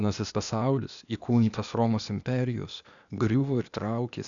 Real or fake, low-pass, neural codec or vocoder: fake; 7.2 kHz; codec, 16 kHz, 0.8 kbps, ZipCodec